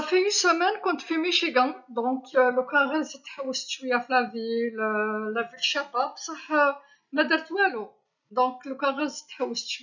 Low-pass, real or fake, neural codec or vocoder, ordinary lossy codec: 7.2 kHz; real; none; none